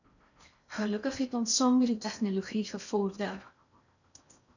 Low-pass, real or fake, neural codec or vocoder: 7.2 kHz; fake; codec, 16 kHz in and 24 kHz out, 0.6 kbps, FocalCodec, streaming, 4096 codes